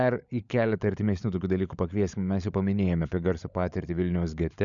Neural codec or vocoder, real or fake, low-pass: codec, 16 kHz, 16 kbps, FunCodec, trained on LibriTTS, 50 frames a second; fake; 7.2 kHz